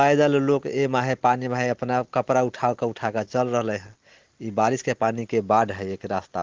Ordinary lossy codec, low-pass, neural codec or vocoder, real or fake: Opus, 16 kbps; 7.2 kHz; none; real